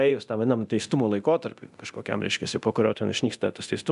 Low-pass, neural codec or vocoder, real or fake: 10.8 kHz; codec, 24 kHz, 0.9 kbps, DualCodec; fake